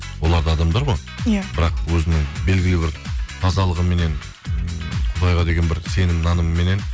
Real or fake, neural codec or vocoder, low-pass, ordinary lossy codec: real; none; none; none